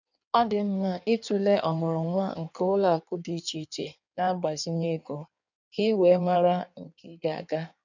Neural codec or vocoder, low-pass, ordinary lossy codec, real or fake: codec, 16 kHz in and 24 kHz out, 1.1 kbps, FireRedTTS-2 codec; 7.2 kHz; none; fake